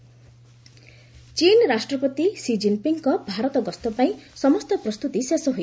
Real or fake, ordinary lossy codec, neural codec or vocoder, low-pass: real; none; none; none